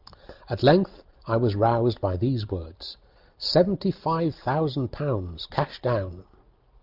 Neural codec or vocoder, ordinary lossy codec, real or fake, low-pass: none; Opus, 24 kbps; real; 5.4 kHz